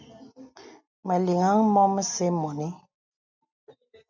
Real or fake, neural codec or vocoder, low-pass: real; none; 7.2 kHz